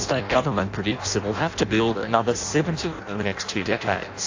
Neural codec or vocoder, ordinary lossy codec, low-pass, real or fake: codec, 16 kHz in and 24 kHz out, 0.6 kbps, FireRedTTS-2 codec; AAC, 48 kbps; 7.2 kHz; fake